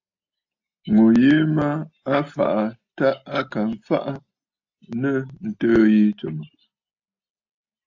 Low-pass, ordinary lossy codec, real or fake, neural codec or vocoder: 7.2 kHz; AAC, 48 kbps; real; none